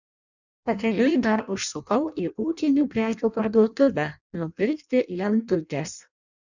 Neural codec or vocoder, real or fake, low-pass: codec, 16 kHz in and 24 kHz out, 0.6 kbps, FireRedTTS-2 codec; fake; 7.2 kHz